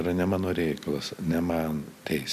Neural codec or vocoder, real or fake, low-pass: none; real; 14.4 kHz